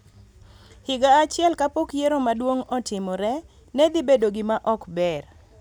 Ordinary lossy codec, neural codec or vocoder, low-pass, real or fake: none; none; 19.8 kHz; real